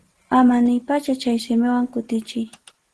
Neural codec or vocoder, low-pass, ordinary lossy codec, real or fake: none; 10.8 kHz; Opus, 16 kbps; real